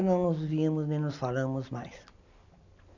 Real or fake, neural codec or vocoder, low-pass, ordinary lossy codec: real; none; 7.2 kHz; none